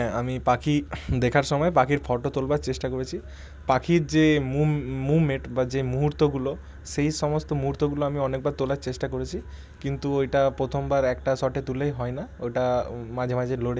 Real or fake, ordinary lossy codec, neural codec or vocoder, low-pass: real; none; none; none